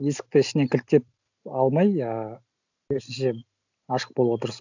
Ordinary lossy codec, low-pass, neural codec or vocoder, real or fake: none; 7.2 kHz; none; real